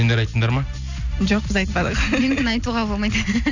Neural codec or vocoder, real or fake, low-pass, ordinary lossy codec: none; real; 7.2 kHz; none